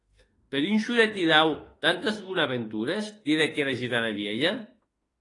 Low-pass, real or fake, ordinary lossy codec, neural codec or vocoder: 10.8 kHz; fake; AAC, 32 kbps; autoencoder, 48 kHz, 32 numbers a frame, DAC-VAE, trained on Japanese speech